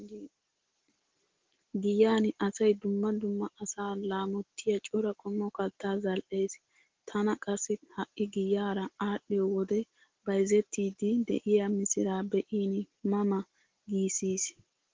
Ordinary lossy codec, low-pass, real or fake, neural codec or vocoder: Opus, 16 kbps; 7.2 kHz; real; none